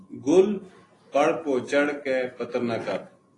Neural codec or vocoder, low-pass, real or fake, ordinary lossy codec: none; 10.8 kHz; real; AAC, 32 kbps